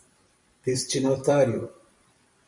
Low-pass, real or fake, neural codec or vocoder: 10.8 kHz; fake; vocoder, 44.1 kHz, 128 mel bands every 256 samples, BigVGAN v2